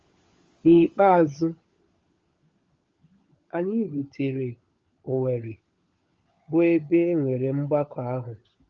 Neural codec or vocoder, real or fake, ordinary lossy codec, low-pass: codec, 16 kHz, 16 kbps, FunCodec, trained on LibriTTS, 50 frames a second; fake; Opus, 32 kbps; 7.2 kHz